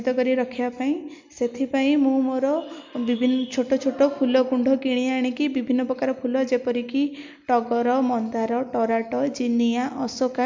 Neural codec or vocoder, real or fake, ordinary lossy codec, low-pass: none; real; MP3, 64 kbps; 7.2 kHz